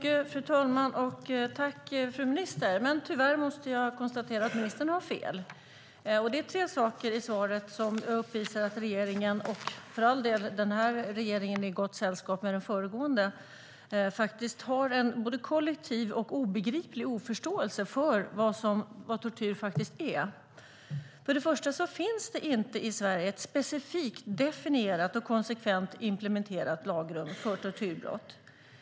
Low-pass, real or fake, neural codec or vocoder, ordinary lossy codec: none; real; none; none